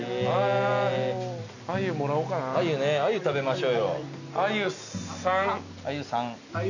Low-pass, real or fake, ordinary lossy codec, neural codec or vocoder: 7.2 kHz; real; none; none